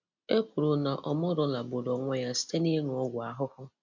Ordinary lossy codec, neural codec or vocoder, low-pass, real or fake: MP3, 64 kbps; none; 7.2 kHz; real